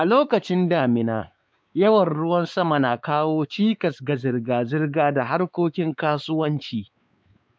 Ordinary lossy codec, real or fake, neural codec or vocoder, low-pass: none; fake; codec, 16 kHz, 4 kbps, X-Codec, WavLM features, trained on Multilingual LibriSpeech; none